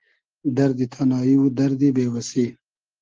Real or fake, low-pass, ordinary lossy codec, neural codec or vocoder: real; 7.2 kHz; Opus, 16 kbps; none